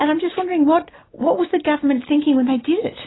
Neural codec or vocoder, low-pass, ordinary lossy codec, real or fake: none; 7.2 kHz; AAC, 16 kbps; real